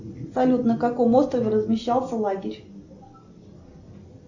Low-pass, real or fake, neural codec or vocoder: 7.2 kHz; real; none